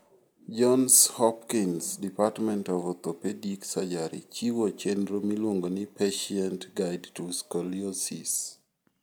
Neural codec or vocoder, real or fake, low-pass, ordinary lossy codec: none; real; none; none